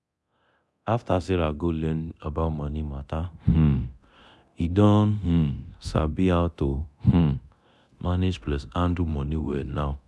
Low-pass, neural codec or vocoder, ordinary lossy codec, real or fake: none; codec, 24 kHz, 0.9 kbps, DualCodec; none; fake